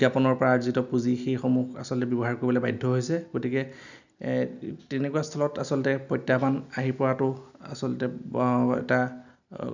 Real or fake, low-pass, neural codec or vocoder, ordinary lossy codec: real; 7.2 kHz; none; none